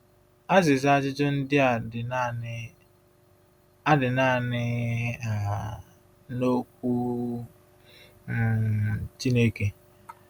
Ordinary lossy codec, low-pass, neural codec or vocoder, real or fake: none; 19.8 kHz; none; real